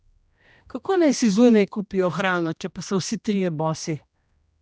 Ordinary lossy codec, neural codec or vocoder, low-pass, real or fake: none; codec, 16 kHz, 1 kbps, X-Codec, HuBERT features, trained on general audio; none; fake